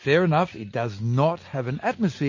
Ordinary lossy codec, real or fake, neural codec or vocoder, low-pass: MP3, 32 kbps; real; none; 7.2 kHz